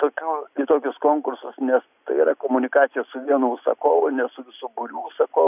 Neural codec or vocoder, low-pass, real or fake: none; 3.6 kHz; real